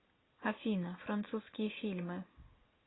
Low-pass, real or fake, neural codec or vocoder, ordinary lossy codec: 7.2 kHz; real; none; AAC, 16 kbps